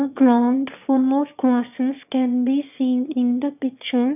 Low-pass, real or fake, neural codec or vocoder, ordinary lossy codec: 3.6 kHz; fake; autoencoder, 22.05 kHz, a latent of 192 numbers a frame, VITS, trained on one speaker; none